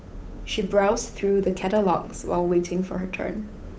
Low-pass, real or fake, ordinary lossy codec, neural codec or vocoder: none; fake; none; codec, 16 kHz, 8 kbps, FunCodec, trained on Chinese and English, 25 frames a second